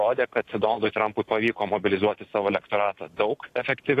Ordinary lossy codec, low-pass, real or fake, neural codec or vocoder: AAC, 48 kbps; 14.4 kHz; real; none